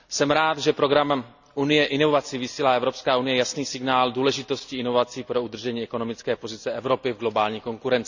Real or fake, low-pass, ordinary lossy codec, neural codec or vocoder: real; 7.2 kHz; none; none